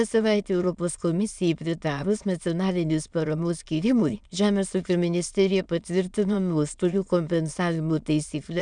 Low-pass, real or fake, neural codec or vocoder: 9.9 kHz; fake; autoencoder, 22.05 kHz, a latent of 192 numbers a frame, VITS, trained on many speakers